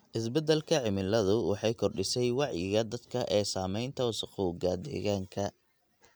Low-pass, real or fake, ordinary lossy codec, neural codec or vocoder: none; real; none; none